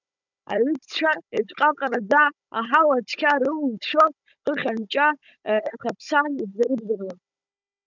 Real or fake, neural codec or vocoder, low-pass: fake; codec, 16 kHz, 16 kbps, FunCodec, trained on Chinese and English, 50 frames a second; 7.2 kHz